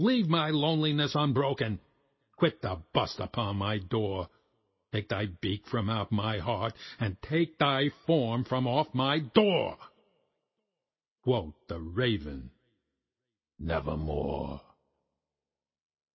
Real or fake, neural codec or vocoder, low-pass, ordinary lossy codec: real; none; 7.2 kHz; MP3, 24 kbps